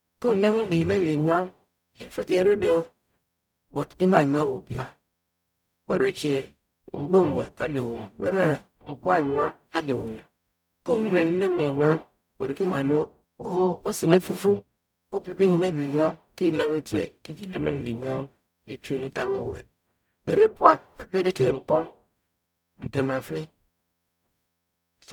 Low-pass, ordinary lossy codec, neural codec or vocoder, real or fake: 19.8 kHz; none; codec, 44.1 kHz, 0.9 kbps, DAC; fake